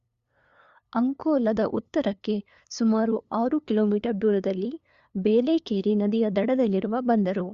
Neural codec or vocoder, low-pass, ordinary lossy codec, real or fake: codec, 16 kHz, 2 kbps, FunCodec, trained on LibriTTS, 25 frames a second; 7.2 kHz; Opus, 64 kbps; fake